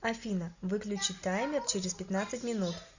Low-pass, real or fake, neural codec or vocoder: 7.2 kHz; real; none